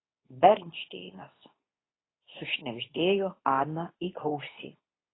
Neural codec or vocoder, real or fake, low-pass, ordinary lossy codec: codec, 24 kHz, 0.9 kbps, WavTokenizer, medium speech release version 2; fake; 7.2 kHz; AAC, 16 kbps